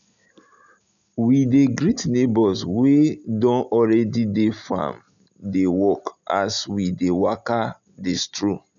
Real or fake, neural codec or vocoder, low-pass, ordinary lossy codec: real; none; 7.2 kHz; none